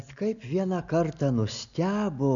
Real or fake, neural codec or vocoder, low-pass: real; none; 7.2 kHz